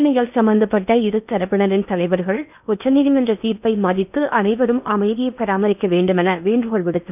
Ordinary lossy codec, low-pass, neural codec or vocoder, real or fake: none; 3.6 kHz; codec, 16 kHz in and 24 kHz out, 0.8 kbps, FocalCodec, streaming, 65536 codes; fake